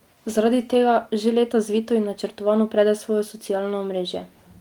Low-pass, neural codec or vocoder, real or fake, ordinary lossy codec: 19.8 kHz; none; real; Opus, 32 kbps